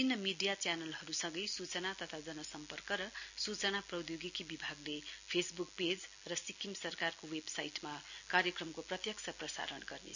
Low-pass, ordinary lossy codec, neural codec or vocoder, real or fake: 7.2 kHz; none; none; real